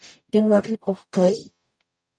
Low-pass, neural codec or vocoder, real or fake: 9.9 kHz; codec, 44.1 kHz, 0.9 kbps, DAC; fake